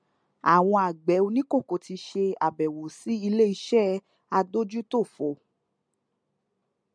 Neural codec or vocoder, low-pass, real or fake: none; 9.9 kHz; real